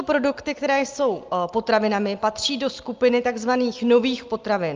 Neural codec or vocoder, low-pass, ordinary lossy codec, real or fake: none; 7.2 kHz; Opus, 24 kbps; real